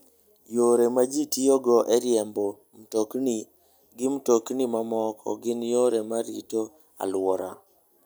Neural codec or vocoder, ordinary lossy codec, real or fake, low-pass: none; none; real; none